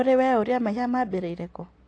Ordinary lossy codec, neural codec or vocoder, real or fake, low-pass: AAC, 48 kbps; none; real; 9.9 kHz